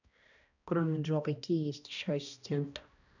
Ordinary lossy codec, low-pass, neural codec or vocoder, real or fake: none; 7.2 kHz; codec, 16 kHz, 1 kbps, X-Codec, HuBERT features, trained on balanced general audio; fake